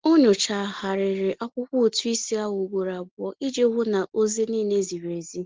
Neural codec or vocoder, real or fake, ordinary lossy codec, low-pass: none; real; Opus, 16 kbps; 7.2 kHz